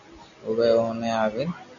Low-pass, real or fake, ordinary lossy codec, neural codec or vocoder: 7.2 kHz; real; MP3, 96 kbps; none